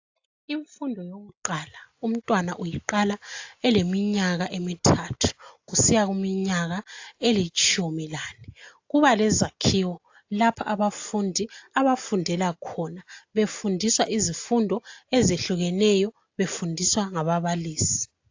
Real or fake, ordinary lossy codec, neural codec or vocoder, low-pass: real; AAC, 48 kbps; none; 7.2 kHz